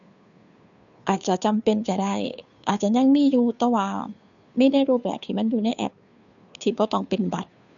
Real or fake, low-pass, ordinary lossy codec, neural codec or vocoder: fake; 7.2 kHz; none; codec, 16 kHz, 2 kbps, FunCodec, trained on Chinese and English, 25 frames a second